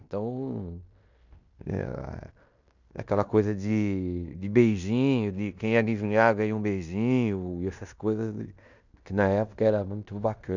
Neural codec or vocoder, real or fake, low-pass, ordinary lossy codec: codec, 16 kHz in and 24 kHz out, 0.9 kbps, LongCat-Audio-Codec, fine tuned four codebook decoder; fake; 7.2 kHz; none